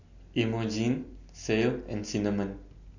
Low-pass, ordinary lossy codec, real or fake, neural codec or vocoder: 7.2 kHz; none; real; none